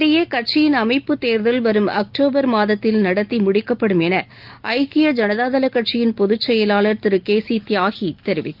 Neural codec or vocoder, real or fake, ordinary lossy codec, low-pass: none; real; Opus, 32 kbps; 5.4 kHz